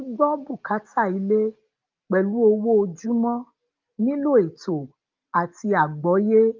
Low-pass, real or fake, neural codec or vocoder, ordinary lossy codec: 7.2 kHz; real; none; Opus, 32 kbps